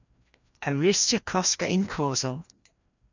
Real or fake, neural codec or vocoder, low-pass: fake; codec, 16 kHz, 1 kbps, FreqCodec, larger model; 7.2 kHz